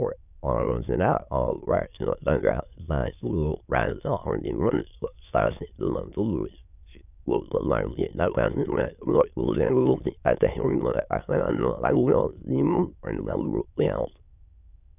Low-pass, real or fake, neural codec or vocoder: 3.6 kHz; fake; autoencoder, 22.05 kHz, a latent of 192 numbers a frame, VITS, trained on many speakers